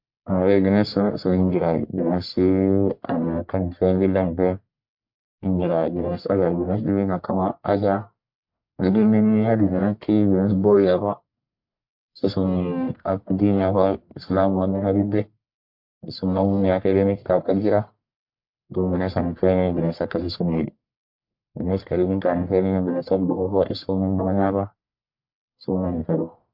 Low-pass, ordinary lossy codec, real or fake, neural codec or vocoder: 5.4 kHz; MP3, 48 kbps; fake; codec, 44.1 kHz, 1.7 kbps, Pupu-Codec